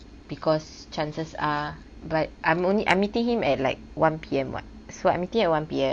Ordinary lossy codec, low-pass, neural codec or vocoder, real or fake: MP3, 64 kbps; 9.9 kHz; none; real